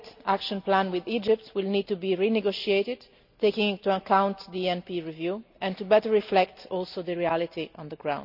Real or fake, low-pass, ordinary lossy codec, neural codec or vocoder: real; 5.4 kHz; none; none